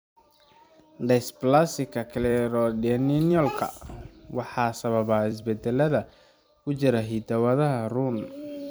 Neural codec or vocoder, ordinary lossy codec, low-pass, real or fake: vocoder, 44.1 kHz, 128 mel bands every 256 samples, BigVGAN v2; none; none; fake